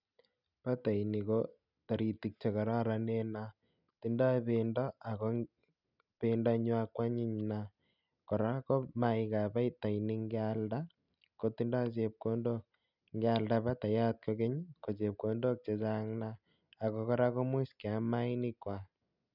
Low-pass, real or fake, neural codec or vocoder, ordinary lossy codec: 5.4 kHz; real; none; none